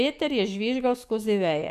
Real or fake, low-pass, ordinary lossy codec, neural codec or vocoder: fake; 14.4 kHz; none; codec, 44.1 kHz, 7.8 kbps, DAC